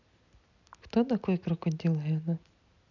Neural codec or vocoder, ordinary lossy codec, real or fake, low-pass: none; none; real; 7.2 kHz